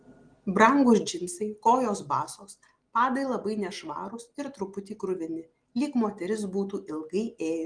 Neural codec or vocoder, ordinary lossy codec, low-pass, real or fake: none; Opus, 24 kbps; 9.9 kHz; real